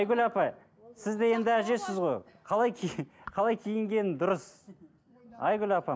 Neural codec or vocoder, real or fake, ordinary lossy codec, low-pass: none; real; none; none